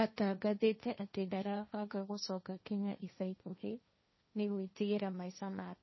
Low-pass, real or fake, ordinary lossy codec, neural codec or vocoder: 7.2 kHz; fake; MP3, 24 kbps; codec, 16 kHz, 1.1 kbps, Voila-Tokenizer